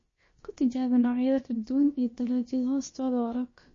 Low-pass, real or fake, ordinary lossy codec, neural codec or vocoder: 7.2 kHz; fake; MP3, 32 kbps; codec, 16 kHz, about 1 kbps, DyCAST, with the encoder's durations